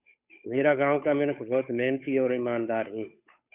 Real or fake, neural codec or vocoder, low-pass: fake; codec, 16 kHz, 8 kbps, FunCodec, trained on Chinese and English, 25 frames a second; 3.6 kHz